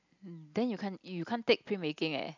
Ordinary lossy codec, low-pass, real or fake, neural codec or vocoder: none; 7.2 kHz; real; none